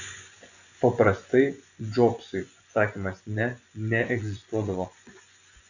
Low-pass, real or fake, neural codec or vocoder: 7.2 kHz; fake; vocoder, 24 kHz, 100 mel bands, Vocos